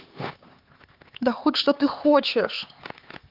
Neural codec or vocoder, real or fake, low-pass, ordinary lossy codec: codec, 16 kHz, 4 kbps, X-Codec, HuBERT features, trained on LibriSpeech; fake; 5.4 kHz; Opus, 32 kbps